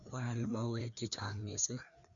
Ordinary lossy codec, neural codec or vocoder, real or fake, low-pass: none; codec, 16 kHz, 2 kbps, FreqCodec, larger model; fake; 7.2 kHz